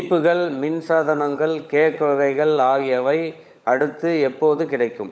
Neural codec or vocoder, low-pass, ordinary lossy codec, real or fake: codec, 16 kHz, 16 kbps, FunCodec, trained on LibriTTS, 50 frames a second; none; none; fake